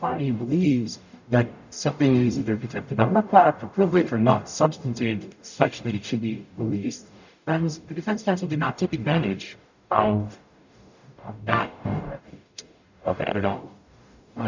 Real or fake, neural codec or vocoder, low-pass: fake; codec, 44.1 kHz, 0.9 kbps, DAC; 7.2 kHz